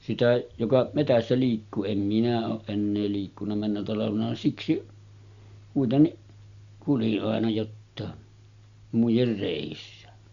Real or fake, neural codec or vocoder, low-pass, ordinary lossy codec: real; none; 7.2 kHz; Opus, 64 kbps